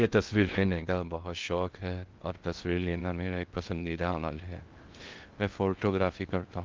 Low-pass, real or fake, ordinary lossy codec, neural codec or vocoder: 7.2 kHz; fake; Opus, 32 kbps; codec, 16 kHz in and 24 kHz out, 0.6 kbps, FocalCodec, streaming, 2048 codes